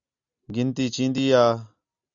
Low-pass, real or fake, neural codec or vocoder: 7.2 kHz; real; none